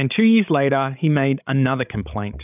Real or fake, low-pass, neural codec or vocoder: fake; 3.6 kHz; codec, 16 kHz, 16 kbps, FreqCodec, larger model